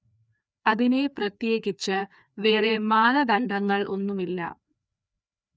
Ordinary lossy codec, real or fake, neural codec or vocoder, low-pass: none; fake; codec, 16 kHz, 2 kbps, FreqCodec, larger model; none